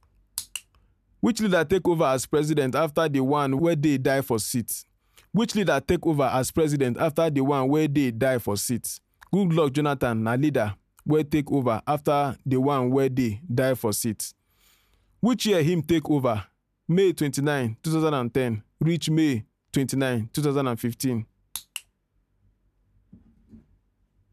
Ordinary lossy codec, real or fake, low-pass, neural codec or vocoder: none; real; 14.4 kHz; none